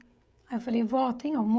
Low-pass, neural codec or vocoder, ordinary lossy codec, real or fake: none; codec, 16 kHz, 16 kbps, FreqCodec, smaller model; none; fake